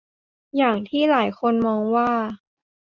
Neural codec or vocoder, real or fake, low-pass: none; real; 7.2 kHz